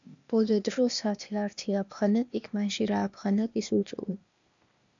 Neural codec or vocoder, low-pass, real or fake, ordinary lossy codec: codec, 16 kHz, 0.8 kbps, ZipCodec; 7.2 kHz; fake; MP3, 64 kbps